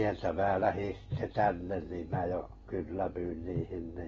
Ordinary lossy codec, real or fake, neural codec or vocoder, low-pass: AAC, 24 kbps; real; none; 7.2 kHz